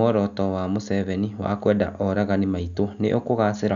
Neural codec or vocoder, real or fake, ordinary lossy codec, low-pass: none; real; none; 7.2 kHz